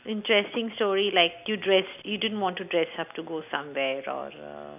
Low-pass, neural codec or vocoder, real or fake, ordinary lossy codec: 3.6 kHz; none; real; none